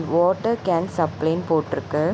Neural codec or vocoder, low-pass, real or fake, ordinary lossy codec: none; none; real; none